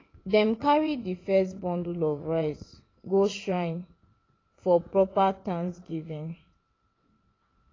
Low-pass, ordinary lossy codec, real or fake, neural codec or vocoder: 7.2 kHz; AAC, 32 kbps; fake; vocoder, 22.05 kHz, 80 mel bands, WaveNeXt